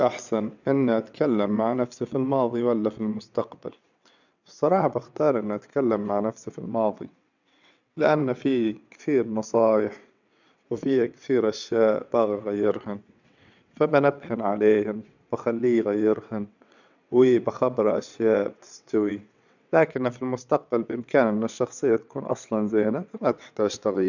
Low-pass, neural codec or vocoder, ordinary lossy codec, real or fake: 7.2 kHz; vocoder, 22.05 kHz, 80 mel bands, WaveNeXt; none; fake